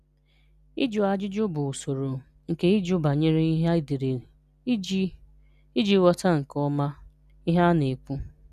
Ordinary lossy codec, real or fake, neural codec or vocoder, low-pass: none; real; none; 14.4 kHz